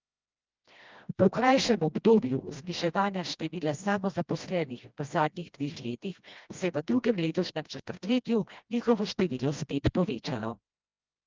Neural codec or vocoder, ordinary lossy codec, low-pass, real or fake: codec, 16 kHz, 1 kbps, FreqCodec, smaller model; Opus, 24 kbps; 7.2 kHz; fake